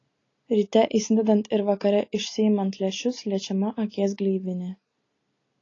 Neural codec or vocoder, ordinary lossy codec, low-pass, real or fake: none; AAC, 32 kbps; 7.2 kHz; real